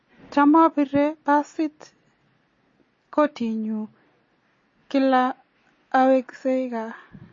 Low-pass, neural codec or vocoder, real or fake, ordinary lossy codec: 7.2 kHz; none; real; MP3, 32 kbps